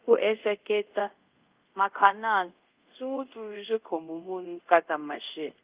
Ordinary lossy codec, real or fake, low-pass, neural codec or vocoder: Opus, 32 kbps; fake; 3.6 kHz; codec, 24 kHz, 0.5 kbps, DualCodec